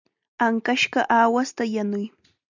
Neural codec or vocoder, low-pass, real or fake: none; 7.2 kHz; real